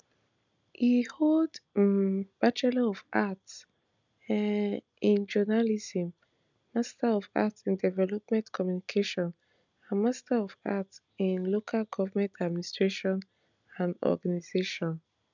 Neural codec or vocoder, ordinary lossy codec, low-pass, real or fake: none; none; 7.2 kHz; real